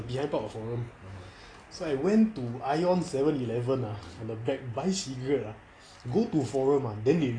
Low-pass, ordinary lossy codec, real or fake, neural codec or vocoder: 9.9 kHz; AAC, 32 kbps; real; none